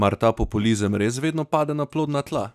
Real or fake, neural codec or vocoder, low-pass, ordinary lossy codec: real; none; 14.4 kHz; none